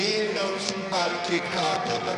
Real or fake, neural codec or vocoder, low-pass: fake; codec, 24 kHz, 0.9 kbps, WavTokenizer, medium music audio release; 10.8 kHz